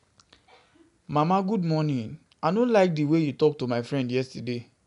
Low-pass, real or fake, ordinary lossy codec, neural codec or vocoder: 10.8 kHz; real; none; none